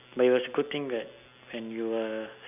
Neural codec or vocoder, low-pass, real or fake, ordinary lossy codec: none; 3.6 kHz; real; none